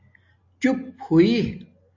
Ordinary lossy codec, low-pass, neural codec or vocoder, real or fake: AAC, 48 kbps; 7.2 kHz; none; real